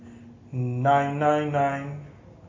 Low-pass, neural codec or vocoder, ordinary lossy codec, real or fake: 7.2 kHz; none; MP3, 32 kbps; real